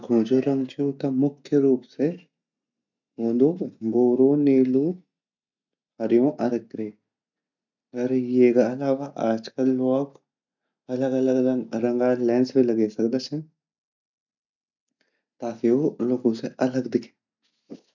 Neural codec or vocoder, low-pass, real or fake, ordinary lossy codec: none; 7.2 kHz; real; none